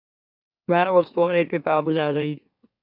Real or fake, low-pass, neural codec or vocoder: fake; 5.4 kHz; autoencoder, 44.1 kHz, a latent of 192 numbers a frame, MeloTTS